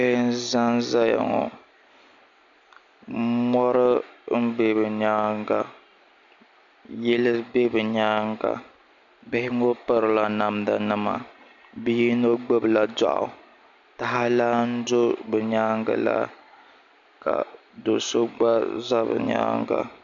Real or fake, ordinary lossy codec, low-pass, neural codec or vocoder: real; MP3, 64 kbps; 7.2 kHz; none